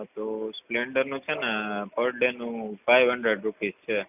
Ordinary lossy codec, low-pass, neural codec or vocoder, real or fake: none; 3.6 kHz; none; real